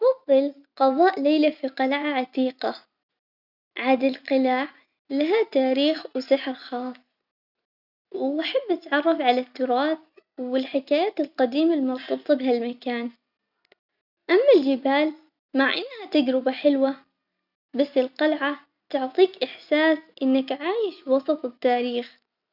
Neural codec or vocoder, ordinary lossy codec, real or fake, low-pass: none; none; real; 5.4 kHz